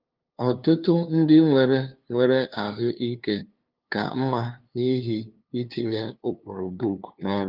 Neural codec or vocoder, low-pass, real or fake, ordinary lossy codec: codec, 16 kHz, 2 kbps, FunCodec, trained on LibriTTS, 25 frames a second; 5.4 kHz; fake; Opus, 32 kbps